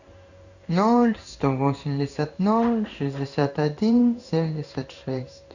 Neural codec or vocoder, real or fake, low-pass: codec, 16 kHz in and 24 kHz out, 1 kbps, XY-Tokenizer; fake; 7.2 kHz